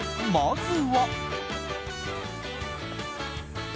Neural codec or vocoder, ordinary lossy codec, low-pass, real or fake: none; none; none; real